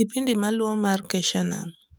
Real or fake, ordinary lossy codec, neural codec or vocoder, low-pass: fake; none; codec, 44.1 kHz, 7.8 kbps, DAC; none